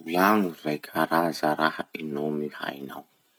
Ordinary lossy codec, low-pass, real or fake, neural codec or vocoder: none; none; real; none